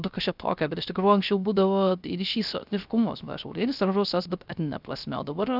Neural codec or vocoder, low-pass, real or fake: codec, 16 kHz, 0.3 kbps, FocalCodec; 5.4 kHz; fake